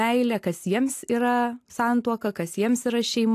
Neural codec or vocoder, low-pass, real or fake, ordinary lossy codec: none; 14.4 kHz; real; AAC, 64 kbps